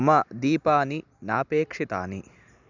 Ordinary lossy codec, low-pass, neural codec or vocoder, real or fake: none; 7.2 kHz; none; real